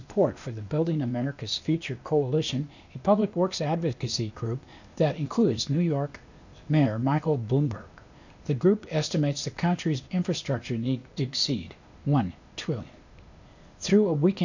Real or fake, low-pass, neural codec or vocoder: fake; 7.2 kHz; codec, 16 kHz, 0.8 kbps, ZipCodec